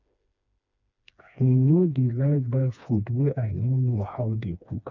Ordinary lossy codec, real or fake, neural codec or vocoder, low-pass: none; fake; codec, 16 kHz, 2 kbps, FreqCodec, smaller model; 7.2 kHz